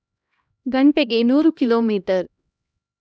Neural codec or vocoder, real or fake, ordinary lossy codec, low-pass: codec, 16 kHz, 1 kbps, X-Codec, HuBERT features, trained on LibriSpeech; fake; none; none